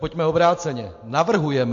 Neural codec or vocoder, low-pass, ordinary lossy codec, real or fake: none; 7.2 kHz; MP3, 48 kbps; real